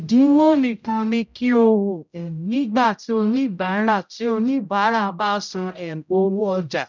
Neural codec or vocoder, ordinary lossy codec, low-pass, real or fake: codec, 16 kHz, 0.5 kbps, X-Codec, HuBERT features, trained on general audio; none; 7.2 kHz; fake